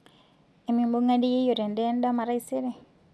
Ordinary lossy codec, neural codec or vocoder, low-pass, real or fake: none; none; none; real